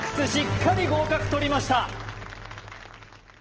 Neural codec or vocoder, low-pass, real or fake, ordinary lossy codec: none; 7.2 kHz; real; Opus, 16 kbps